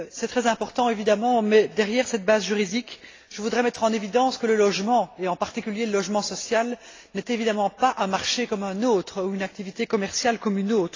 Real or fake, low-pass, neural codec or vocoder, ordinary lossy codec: real; 7.2 kHz; none; AAC, 32 kbps